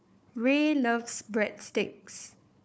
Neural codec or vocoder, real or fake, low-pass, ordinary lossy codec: codec, 16 kHz, 4 kbps, FunCodec, trained on Chinese and English, 50 frames a second; fake; none; none